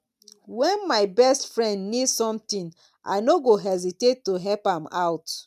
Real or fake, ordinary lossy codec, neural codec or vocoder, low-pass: real; none; none; 14.4 kHz